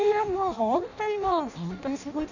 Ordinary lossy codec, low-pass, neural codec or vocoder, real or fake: none; 7.2 kHz; codec, 16 kHz in and 24 kHz out, 0.6 kbps, FireRedTTS-2 codec; fake